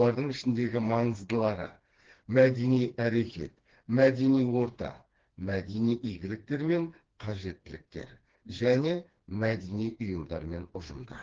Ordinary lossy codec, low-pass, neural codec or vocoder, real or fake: Opus, 16 kbps; 7.2 kHz; codec, 16 kHz, 2 kbps, FreqCodec, smaller model; fake